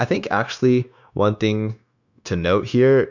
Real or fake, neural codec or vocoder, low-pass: fake; codec, 16 kHz, 0.9 kbps, LongCat-Audio-Codec; 7.2 kHz